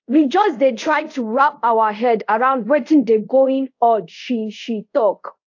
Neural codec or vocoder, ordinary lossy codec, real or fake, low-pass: codec, 24 kHz, 0.5 kbps, DualCodec; AAC, 48 kbps; fake; 7.2 kHz